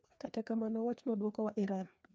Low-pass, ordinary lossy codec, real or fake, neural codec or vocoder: none; none; fake; codec, 16 kHz, 2 kbps, FreqCodec, larger model